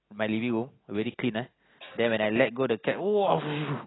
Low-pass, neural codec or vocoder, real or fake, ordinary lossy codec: 7.2 kHz; none; real; AAC, 16 kbps